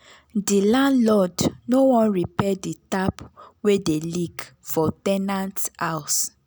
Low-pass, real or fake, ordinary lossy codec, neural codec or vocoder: none; real; none; none